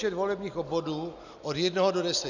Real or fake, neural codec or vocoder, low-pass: real; none; 7.2 kHz